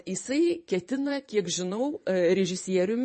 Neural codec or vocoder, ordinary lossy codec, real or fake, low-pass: vocoder, 22.05 kHz, 80 mel bands, Vocos; MP3, 32 kbps; fake; 9.9 kHz